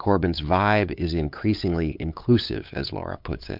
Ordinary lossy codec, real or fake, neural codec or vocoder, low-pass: MP3, 48 kbps; fake; codec, 16 kHz, 8 kbps, FunCodec, trained on LibriTTS, 25 frames a second; 5.4 kHz